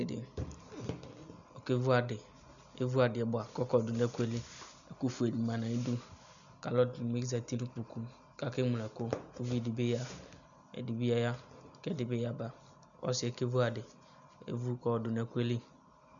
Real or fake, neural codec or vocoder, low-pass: real; none; 7.2 kHz